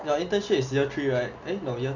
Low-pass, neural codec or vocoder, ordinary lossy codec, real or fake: 7.2 kHz; none; none; real